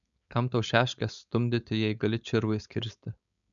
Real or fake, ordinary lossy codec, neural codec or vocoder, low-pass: fake; MP3, 96 kbps; codec, 16 kHz, 4.8 kbps, FACodec; 7.2 kHz